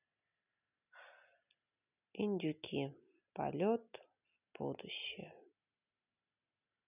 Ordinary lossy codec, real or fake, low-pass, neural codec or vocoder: none; real; 3.6 kHz; none